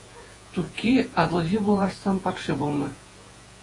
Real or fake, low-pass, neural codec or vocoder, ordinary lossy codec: fake; 10.8 kHz; vocoder, 48 kHz, 128 mel bands, Vocos; AAC, 32 kbps